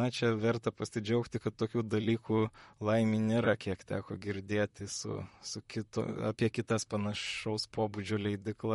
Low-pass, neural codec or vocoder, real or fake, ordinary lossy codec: 19.8 kHz; vocoder, 44.1 kHz, 128 mel bands, Pupu-Vocoder; fake; MP3, 48 kbps